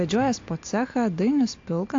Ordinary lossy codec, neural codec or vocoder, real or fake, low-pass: AAC, 48 kbps; none; real; 7.2 kHz